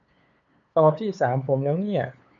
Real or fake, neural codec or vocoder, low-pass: fake; codec, 16 kHz, 8 kbps, FunCodec, trained on LibriTTS, 25 frames a second; 7.2 kHz